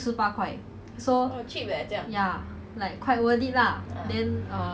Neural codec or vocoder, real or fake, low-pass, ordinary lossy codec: none; real; none; none